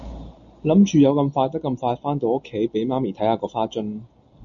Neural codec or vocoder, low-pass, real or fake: none; 7.2 kHz; real